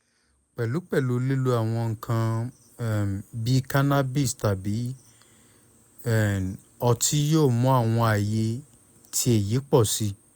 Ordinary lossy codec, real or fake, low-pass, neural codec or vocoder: none; real; none; none